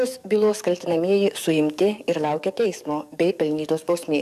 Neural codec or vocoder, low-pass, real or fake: codec, 44.1 kHz, 7.8 kbps, DAC; 14.4 kHz; fake